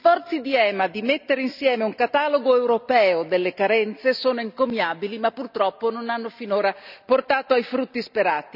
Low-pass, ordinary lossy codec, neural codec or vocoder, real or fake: 5.4 kHz; none; none; real